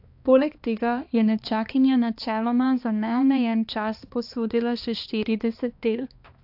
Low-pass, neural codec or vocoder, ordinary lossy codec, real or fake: 5.4 kHz; codec, 16 kHz, 2 kbps, X-Codec, HuBERT features, trained on balanced general audio; AAC, 48 kbps; fake